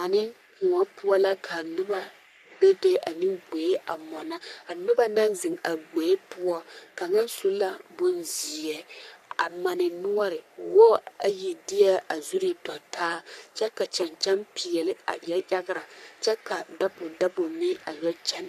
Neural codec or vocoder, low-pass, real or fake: codec, 44.1 kHz, 3.4 kbps, Pupu-Codec; 14.4 kHz; fake